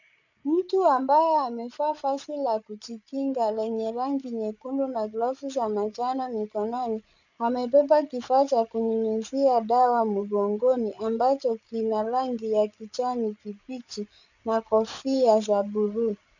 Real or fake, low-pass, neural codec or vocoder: fake; 7.2 kHz; codec, 16 kHz, 8 kbps, FreqCodec, larger model